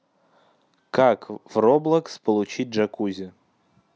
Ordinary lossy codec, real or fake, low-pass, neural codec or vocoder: none; real; none; none